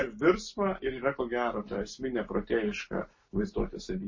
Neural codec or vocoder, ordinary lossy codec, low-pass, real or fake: none; MP3, 32 kbps; 7.2 kHz; real